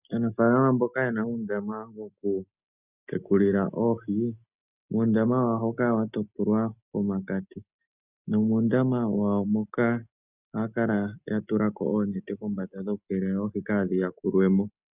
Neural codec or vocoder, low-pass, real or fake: none; 3.6 kHz; real